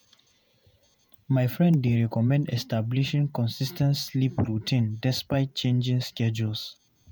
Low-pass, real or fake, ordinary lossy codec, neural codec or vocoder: none; fake; none; vocoder, 48 kHz, 128 mel bands, Vocos